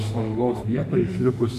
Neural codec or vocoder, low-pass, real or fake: autoencoder, 48 kHz, 32 numbers a frame, DAC-VAE, trained on Japanese speech; 14.4 kHz; fake